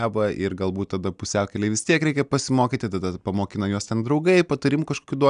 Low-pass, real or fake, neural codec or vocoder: 9.9 kHz; real; none